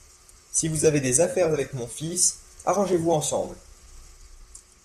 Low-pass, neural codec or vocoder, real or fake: 14.4 kHz; vocoder, 44.1 kHz, 128 mel bands, Pupu-Vocoder; fake